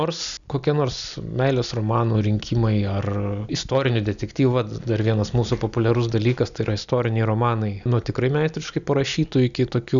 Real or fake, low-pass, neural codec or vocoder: real; 7.2 kHz; none